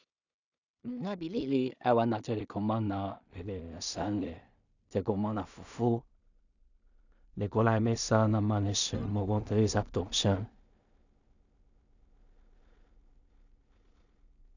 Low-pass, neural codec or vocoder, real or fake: 7.2 kHz; codec, 16 kHz in and 24 kHz out, 0.4 kbps, LongCat-Audio-Codec, two codebook decoder; fake